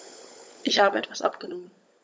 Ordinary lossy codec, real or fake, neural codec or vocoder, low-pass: none; fake; codec, 16 kHz, 16 kbps, FunCodec, trained on LibriTTS, 50 frames a second; none